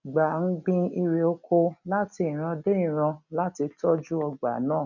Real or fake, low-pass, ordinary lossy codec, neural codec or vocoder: real; 7.2 kHz; none; none